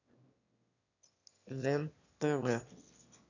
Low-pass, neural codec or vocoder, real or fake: 7.2 kHz; autoencoder, 22.05 kHz, a latent of 192 numbers a frame, VITS, trained on one speaker; fake